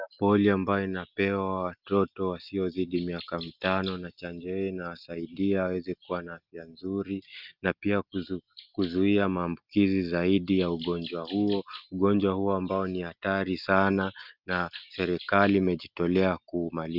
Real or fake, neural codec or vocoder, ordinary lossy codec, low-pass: real; none; Opus, 24 kbps; 5.4 kHz